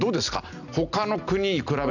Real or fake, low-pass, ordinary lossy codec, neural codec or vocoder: real; 7.2 kHz; none; none